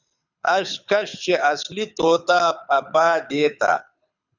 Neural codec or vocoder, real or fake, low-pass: codec, 24 kHz, 6 kbps, HILCodec; fake; 7.2 kHz